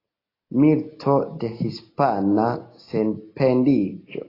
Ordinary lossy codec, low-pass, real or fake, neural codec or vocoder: AAC, 24 kbps; 5.4 kHz; real; none